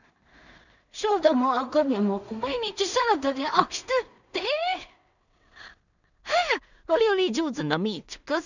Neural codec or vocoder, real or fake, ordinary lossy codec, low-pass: codec, 16 kHz in and 24 kHz out, 0.4 kbps, LongCat-Audio-Codec, two codebook decoder; fake; none; 7.2 kHz